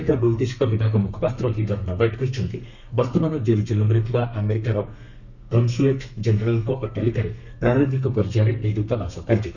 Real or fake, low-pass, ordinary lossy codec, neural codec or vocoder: fake; 7.2 kHz; none; codec, 32 kHz, 1.9 kbps, SNAC